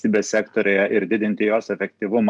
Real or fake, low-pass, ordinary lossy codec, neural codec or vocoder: real; 10.8 kHz; MP3, 96 kbps; none